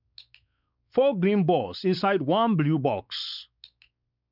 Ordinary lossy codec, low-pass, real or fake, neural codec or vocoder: none; 5.4 kHz; fake; codec, 16 kHz, 4 kbps, X-Codec, WavLM features, trained on Multilingual LibriSpeech